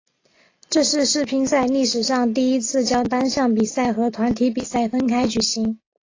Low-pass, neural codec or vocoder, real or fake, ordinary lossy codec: 7.2 kHz; none; real; AAC, 32 kbps